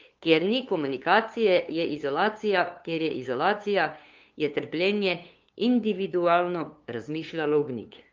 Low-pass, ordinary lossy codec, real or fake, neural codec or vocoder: 7.2 kHz; Opus, 24 kbps; fake; codec, 16 kHz, 2 kbps, FunCodec, trained on LibriTTS, 25 frames a second